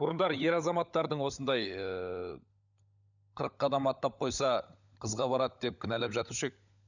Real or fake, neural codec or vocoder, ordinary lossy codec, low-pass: fake; codec, 16 kHz, 16 kbps, FunCodec, trained on LibriTTS, 50 frames a second; none; 7.2 kHz